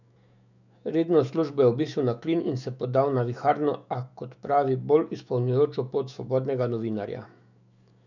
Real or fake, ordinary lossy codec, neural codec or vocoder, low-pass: fake; none; autoencoder, 48 kHz, 128 numbers a frame, DAC-VAE, trained on Japanese speech; 7.2 kHz